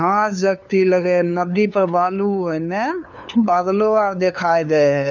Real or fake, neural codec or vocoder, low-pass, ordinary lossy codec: fake; codec, 16 kHz, 2 kbps, FunCodec, trained on LibriTTS, 25 frames a second; 7.2 kHz; none